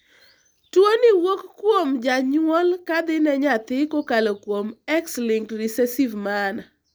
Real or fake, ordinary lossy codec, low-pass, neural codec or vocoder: real; none; none; none